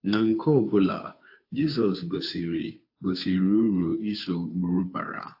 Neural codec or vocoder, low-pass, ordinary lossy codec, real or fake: codec, 16 kHz, 2 kbps, FunCodec, trained on Chinese and English, 25 frames a second; 5.4 kHz; AAC, 32 kbps; fake